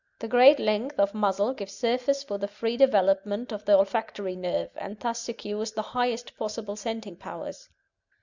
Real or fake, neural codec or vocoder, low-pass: real; none; 7.2 kHz